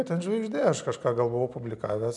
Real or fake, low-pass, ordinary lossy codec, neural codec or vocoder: real; 10.8 kHz; MP3, 96 kbps; none